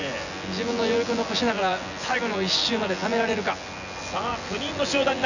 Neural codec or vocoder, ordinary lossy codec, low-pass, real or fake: vocoder, 24 kHz, 100 mel bands, Vocos; none; 7.2 kHz; fake